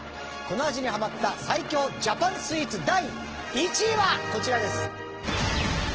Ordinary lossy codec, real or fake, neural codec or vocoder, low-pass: Opus, 16 kbps; real; none; 7.2 kHz